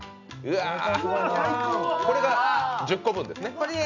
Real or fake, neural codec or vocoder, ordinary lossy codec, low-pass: real; none; none; 7.2 kHz